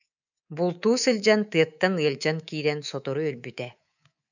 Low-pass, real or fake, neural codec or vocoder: 7.2 kHz; fake; codec, 24 kHz, 3.1 kbps, DualCodec